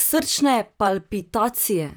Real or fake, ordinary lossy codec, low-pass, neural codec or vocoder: fake; none; none; vocoder, 44.1 kHz, 128 mel bands, Pupu-Vocoder